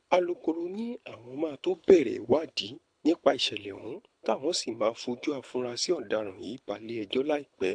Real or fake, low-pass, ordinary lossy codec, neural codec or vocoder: fake; 9.9 kHz; AAC, 64 kbps; codec, 24 kHz, 6 kbps, HILCodec